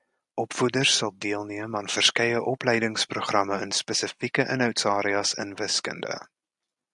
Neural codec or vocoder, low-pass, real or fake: none; 10.8 kHz; real